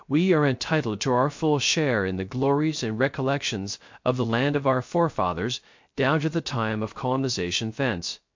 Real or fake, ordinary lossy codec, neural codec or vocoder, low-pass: fake; MP3, 48 kbps; codec, 16 kHz, 0.3 kbps, FocalCodec; 7.2 kHz